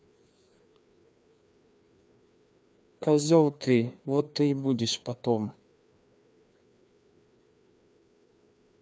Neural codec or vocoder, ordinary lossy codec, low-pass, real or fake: codec, 16 kHz, 2 kbps, FreqCodec, larger model; none; none; fake